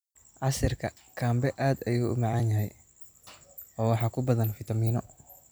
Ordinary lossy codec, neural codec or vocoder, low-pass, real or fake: none; vocoder, 44.1 kHz, 128 mel bands every 256 samples, BigVGAN v2; none; fake